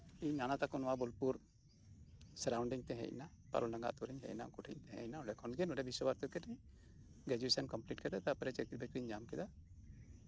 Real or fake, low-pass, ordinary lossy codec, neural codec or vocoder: real; none; none; none